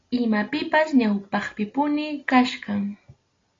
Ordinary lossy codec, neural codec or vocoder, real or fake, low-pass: AAC, 32 kbps; none; real; 7.2 kHz